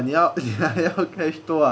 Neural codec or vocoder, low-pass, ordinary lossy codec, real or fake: none; none; none; real